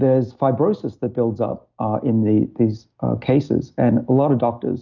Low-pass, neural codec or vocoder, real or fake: 7.2 kHz; none; real